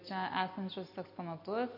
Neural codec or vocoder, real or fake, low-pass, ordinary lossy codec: none; real; 5.4 kHz; MP3, 32 kbps